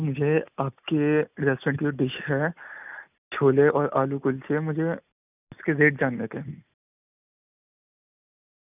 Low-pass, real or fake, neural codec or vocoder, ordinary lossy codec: 3.6 kHz; real; none; none